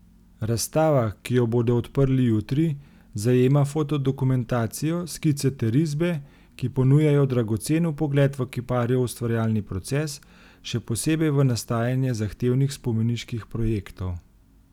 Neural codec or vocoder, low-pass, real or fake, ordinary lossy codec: none; 19.8 kHz; real; none